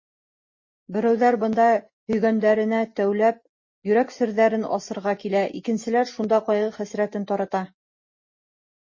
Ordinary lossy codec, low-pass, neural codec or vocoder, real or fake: MP3, 32 kbps; 7.2 kHz; none; real